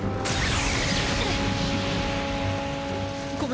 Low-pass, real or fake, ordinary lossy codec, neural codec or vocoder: none; real; none; none